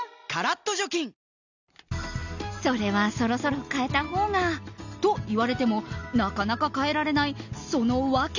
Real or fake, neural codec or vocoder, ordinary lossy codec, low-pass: real; none; none; 7.2 kHz